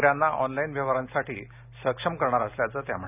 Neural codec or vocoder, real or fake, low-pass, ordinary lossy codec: none; real; 3.6 kHz; none